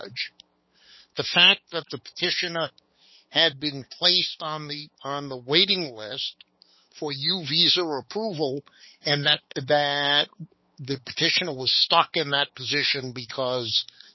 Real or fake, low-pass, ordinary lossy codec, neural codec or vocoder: fake; 7.2 kHz; MP3, 24 kbps; codec, 16 kHz, 4 kbps, X-Codec, HuBERT features, trained on balanced general audio